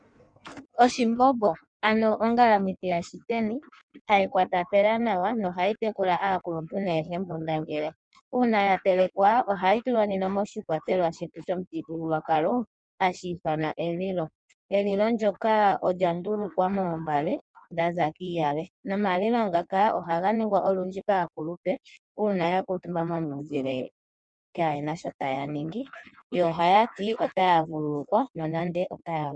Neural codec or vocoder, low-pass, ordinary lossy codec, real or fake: codec, 16 kHz in and 24 kHz out, 1.1 kbps, FireRedTTS-2 codec; 9.9 kHz; MP3, 96 kbps; fake